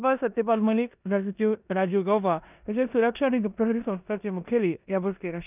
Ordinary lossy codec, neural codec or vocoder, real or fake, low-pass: none; codec, 16 kHz in and 24 kHz out, 0.9 kbps, LongCat-Audio-Codec, four codebook decoder; fake; 3.6 kHz